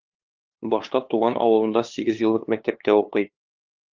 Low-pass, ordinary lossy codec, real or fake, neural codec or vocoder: 7.2 kHz; Opus, 24 kbps; fake; codec, 16 kHz, 2 kbps, FunCodec, trained on LibriTTS, 25 frames a second